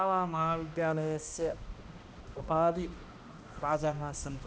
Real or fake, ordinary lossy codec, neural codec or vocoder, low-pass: fake; none; codec, 16 kHz, 1 kbps, X-Codec, HuBERT features, trained on balanced general audio; none